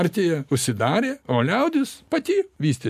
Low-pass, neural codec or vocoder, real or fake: 14.4 kHz; none; real